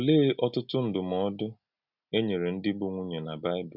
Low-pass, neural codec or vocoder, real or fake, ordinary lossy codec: 5.4 kHz; none; real; none